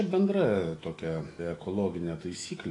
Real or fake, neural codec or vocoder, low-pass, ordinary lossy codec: fake; autoencoder, 48 kHz, 128 numbers a frame, DAC-VAE, trained on Japanese speech; 10.8 kHz; AAC, 32 kbps